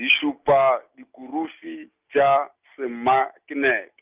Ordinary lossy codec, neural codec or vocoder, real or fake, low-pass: Opus, 24 kbps; none; real; 3.6 kHz